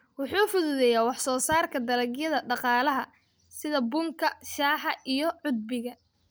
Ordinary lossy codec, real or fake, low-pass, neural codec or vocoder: none; real; none; none